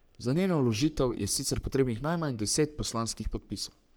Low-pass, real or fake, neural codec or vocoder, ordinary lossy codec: none; fake; codec, 44.1 kHz, 3.4 kbps, Pupu-Codec; none